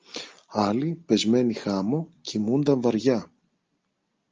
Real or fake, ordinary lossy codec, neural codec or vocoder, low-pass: real; Opus, 24 kbps; none; 7.2 kHz